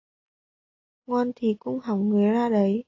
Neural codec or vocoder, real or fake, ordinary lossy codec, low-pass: none; real; AAC, 32 kbps; 7.2 kHz